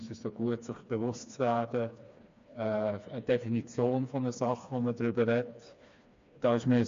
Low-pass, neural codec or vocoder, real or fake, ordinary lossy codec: 7.2 kHz; codec, 16 kHz, 2 kbps, FreqCodec, smaller model; fake; MP3, 48 kbps